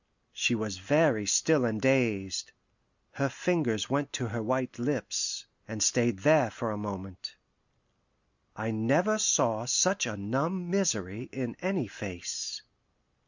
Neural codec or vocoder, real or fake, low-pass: none; real; 7.2 kHz